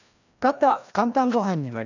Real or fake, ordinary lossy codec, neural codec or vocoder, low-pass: fake; none; codec, 16 kHz, 1 kbps, FreqCodec, larger model; 7.2 kHz